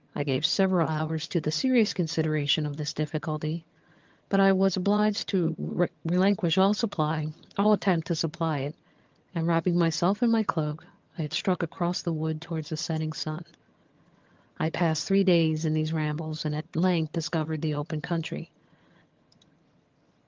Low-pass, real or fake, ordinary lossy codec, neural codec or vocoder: 7.2 kHz; fake; Opus, 32 kbps; vocoder, 22.05 kHz, 80 mel bands, HiFi-GAN